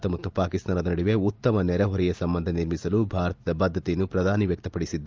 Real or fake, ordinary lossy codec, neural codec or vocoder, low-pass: real; Opus, 24 kbps; none; 7.2 kHz